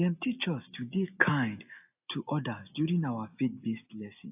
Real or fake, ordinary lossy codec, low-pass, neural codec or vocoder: real; AAC, 32 kbps; 3.6 kHz; none